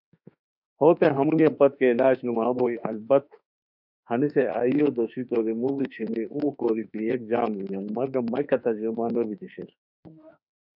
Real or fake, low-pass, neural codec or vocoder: fake; 5.4 kHz; autoencoder, 48 kHz, 32 numbers a frame, DAC-VAE, trained on Japanese speech